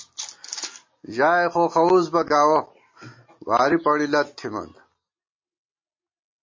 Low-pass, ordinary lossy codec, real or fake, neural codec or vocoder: 7.2 kHz; MP3, 32 kbps; real; none